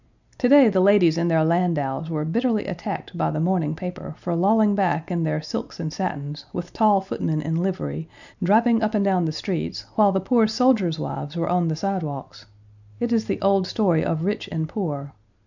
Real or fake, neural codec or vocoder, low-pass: real; none; 7.2 kHz